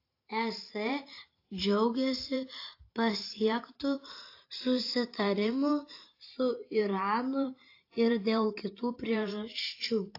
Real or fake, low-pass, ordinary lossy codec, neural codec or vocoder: fake; 5.4 kHz; AAC, 32 kbps; vocoder, 44.1 kHz, 128 mel bands every 512 samples, BigVGAN v2